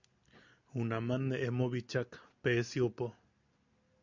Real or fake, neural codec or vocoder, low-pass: real; none; 7.2 kHz